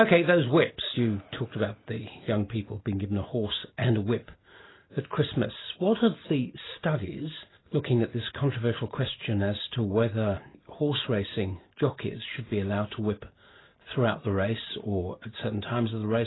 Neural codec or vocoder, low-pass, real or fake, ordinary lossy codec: none; 7.2 kHz; real; AAC, 16 kbps